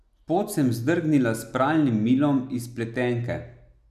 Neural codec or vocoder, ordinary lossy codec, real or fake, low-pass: none; none; real; 14.4 kHz